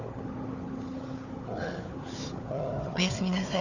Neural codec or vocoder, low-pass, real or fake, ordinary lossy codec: codec, 16 kHz, 4 kbps, FunCodec, trained on Chinese and English, 50 frames a second; 7.2 kHz; fake; none